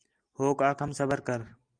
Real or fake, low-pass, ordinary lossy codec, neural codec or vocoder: real; 9.9 kHz; Opus, 32 kbps; none